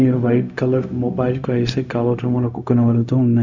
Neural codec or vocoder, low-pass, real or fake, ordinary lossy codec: codec, 16 kHz, 0.4 kbps, LongCat-Audio-Codec; 7.2 kHz; fake; none